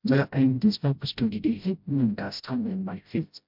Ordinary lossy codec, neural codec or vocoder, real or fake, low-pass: MP3, 48 kbps; codec, 16 kHz, 0.5 kbps, FreqCodec, smaller model; fake; 5.4 kHz